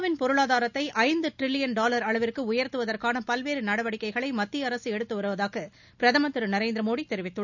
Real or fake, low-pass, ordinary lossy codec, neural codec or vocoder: real; 7.2 kHz; none; none